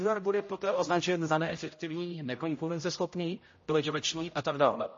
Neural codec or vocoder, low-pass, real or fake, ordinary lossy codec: codec, 16 kHz, 0.5 kbps, X-Codec, HuBERT features, trained on general audio; 7.2 kHz; fake; MP3, 32 kbps